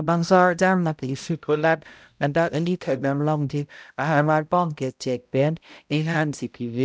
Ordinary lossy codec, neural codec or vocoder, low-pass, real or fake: none; codec, 16 kHz, 0.5 kbps, X-Codec, HuBERT features, trained on balanced general audio; none; fake